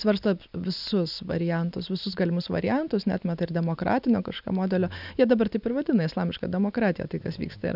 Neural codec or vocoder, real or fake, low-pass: none; real; 5.4 kHz